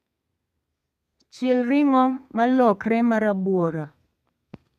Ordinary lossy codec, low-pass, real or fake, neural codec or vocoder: none; 14.4 kHz; fake; codec, 32 kHz, 1.9 kbps, SNAC